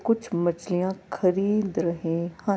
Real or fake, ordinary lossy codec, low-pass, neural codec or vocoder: real; none; none; none